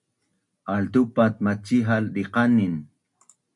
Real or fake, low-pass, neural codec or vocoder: real; 10.8 kHz; none